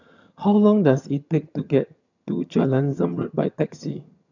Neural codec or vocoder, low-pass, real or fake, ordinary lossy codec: vocoder, 22.05 kHz, 80 mel bands, HiFi-GAN; 7.2 kHz; fake; none